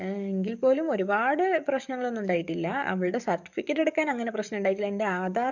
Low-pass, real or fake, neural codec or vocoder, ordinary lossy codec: 7.2 kHz; fake; codec, 44.1 kHz, 7.8 kbps, DAC; none